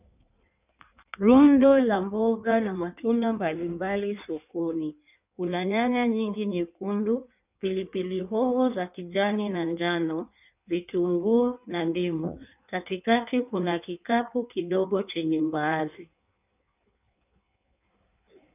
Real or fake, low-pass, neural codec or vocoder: fake; 3.6 kHz; codec, 16 kHz in and 24 kHz out, 1.1 kbps, FireRedTTS-2 codec